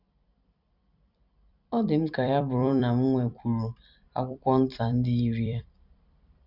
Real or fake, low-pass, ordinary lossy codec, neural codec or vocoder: fake; 5.4 kHz; none; vocoder, 44.1 kHz, 128 mel bands every 256 samples, BigVGAN v2